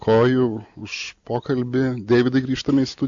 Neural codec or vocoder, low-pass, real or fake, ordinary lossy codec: none; 7.2 kHz; real; AAC, 64 kbps